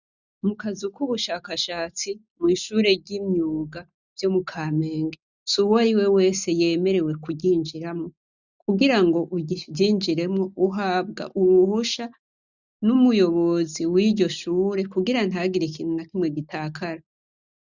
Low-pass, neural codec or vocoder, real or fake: 7.2 kHz; none; real